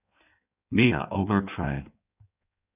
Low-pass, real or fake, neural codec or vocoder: 3.6 kHz; fake; codec, 16 kHz in and 24 kHz out, 1.1 kbps, FireRedTTS-2 codec